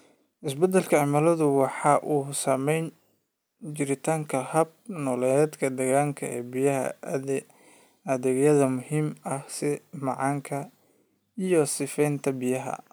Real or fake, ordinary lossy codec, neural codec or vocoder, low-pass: real; none; none; none